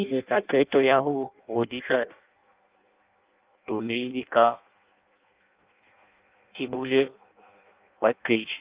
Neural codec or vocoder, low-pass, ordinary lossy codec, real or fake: codec, 16 kHz in and 24 kHz out, 0.6 kbps, FireRedTTS-2 codec; 3.6 kHz; Opus, 32 kbps; fake